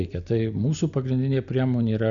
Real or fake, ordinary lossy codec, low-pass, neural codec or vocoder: real; MP3, 96 kbps; 7.2 kHz; none